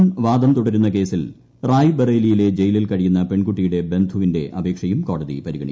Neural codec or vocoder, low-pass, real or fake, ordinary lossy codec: none; none; real; none